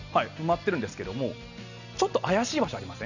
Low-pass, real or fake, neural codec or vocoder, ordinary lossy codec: 7.2 kHz; real; none; none